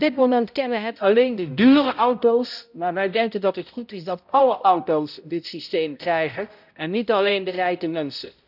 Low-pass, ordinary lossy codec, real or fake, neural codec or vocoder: 5.4 kHz; none; fake; codec, 16 kHz, 0.5 kbps, X-Codec, HuBERT features, trained on balanced general audio